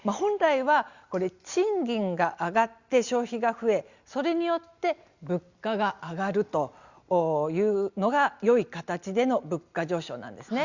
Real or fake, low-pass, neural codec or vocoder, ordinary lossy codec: real; 7.2 kHz; none; Opus, 64 kbps